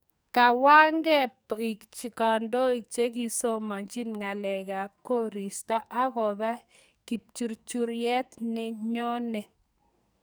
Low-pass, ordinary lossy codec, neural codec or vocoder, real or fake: none; none; codec, 44.1 kHz, 2.6 kbps, SNAC; fake